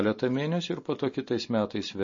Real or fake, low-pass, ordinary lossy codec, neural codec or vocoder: real; 7.2 kHz; MP3, 32 kbps; none